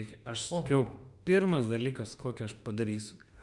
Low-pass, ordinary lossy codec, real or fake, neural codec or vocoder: 10.8 kHz; Opus, 64 kbps; fake; autoencoder, 48 kHz, 32 numbers a frame, DAC-VAE, trained on Japanese speech